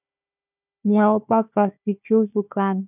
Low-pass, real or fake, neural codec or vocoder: 3.6 kHz; fake; codec, 16 kHz, 1 kbps, FunCodec, trained on Chinese and English, 50 frames a second